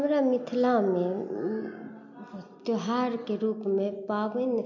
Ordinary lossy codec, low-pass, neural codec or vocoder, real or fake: MP3, 48 kbps; 7.2 kHz; none; real